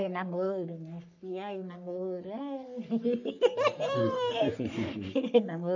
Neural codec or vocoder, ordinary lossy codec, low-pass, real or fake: codec, 44.1 kHz, 3.4 kbps, Pupu-Codec; none; 7.2 kHz; fake